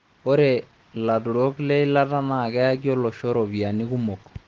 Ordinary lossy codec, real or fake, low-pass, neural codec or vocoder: Opus, 16 kbps; real; 7.2 kHz; none